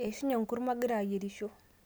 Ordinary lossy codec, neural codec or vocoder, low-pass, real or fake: none; none; none; real